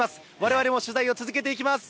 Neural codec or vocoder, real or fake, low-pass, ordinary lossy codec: none; real; none; none